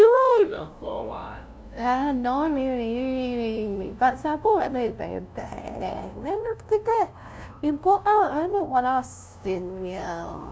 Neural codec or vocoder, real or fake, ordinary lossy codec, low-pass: codec, 16 kHz, 0.5 kbps, FunCodec, trained on LibriTTS, 25 frames a second; fake; none; none